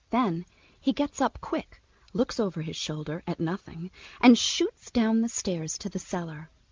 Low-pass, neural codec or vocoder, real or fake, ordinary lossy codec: 7.2 kHz; none; real; Opus, 24 kbps